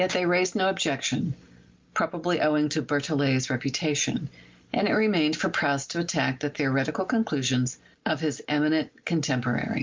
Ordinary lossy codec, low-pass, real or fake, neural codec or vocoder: Opus, 24 kbps; 7.2 kHz; real; none